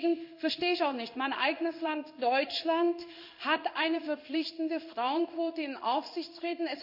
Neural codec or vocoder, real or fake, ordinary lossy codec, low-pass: codec, 16 kHz in and 24 kHz out, 1 kbps, XY-Tokenizer; fake; none; 5.4 kHz